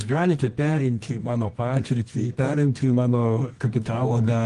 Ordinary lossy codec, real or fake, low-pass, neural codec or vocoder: Opus, 24 kbps; fake; 10.8 kHz; codec, 24 kHz, 0.9 kbps, WavTokenizer, medium music audio release